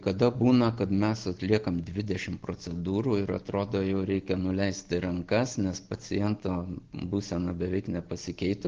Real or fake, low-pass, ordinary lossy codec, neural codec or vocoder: real; 7.2 kHz; Opus, 16 kbps; none